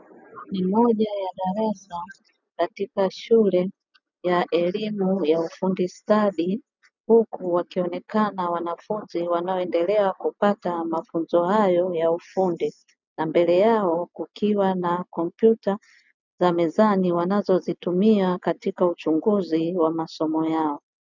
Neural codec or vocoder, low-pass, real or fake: none; 7.2 kHz; real